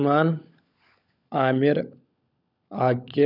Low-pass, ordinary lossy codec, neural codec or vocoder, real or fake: 5.4 kHz; none; codec, 16 kHz, 16 kbps, FunCodec, trained on LibriTTS, 50 frames a second; fake